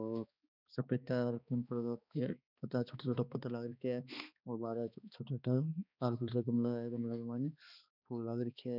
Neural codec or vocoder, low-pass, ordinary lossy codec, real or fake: codec, 16 kHz, 2 kbps, X-Codec, HuBERT features, trained on balanced general audio; 5.4 kHz; none; fake